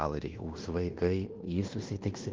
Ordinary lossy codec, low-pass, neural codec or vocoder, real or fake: Opus, 16 kbps; 7.2 kHz; codec, 16 kHz in and 24 kHz out, 0.9 kbps, LongCat-Audio-Codec, fine tuned four codebook decoder; fake